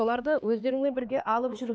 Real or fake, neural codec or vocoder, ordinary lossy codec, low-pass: fake; codec, 16 kHz, 2 kbps, X-Codec, HuBERT features, trained on LibriSpeech; none; none